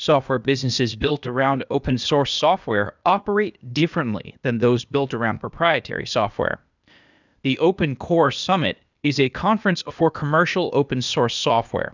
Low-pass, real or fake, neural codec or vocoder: 7.2 kHz; fake; codec, 16 kHz, 0.8 kbps, ZipCodec